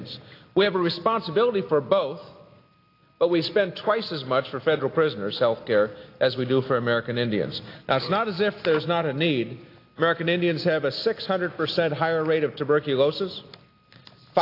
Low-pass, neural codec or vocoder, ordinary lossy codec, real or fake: 5.4 kHz; none; AAC, 32 kbps; real